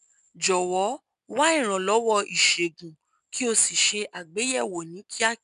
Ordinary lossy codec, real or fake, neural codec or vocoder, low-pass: none; real; none; 10.8 kHz